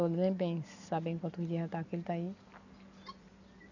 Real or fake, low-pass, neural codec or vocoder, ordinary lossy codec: real; 7.2 kHz; none; none